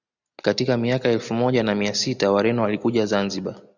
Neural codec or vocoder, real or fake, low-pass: none; real; 7.2 kHz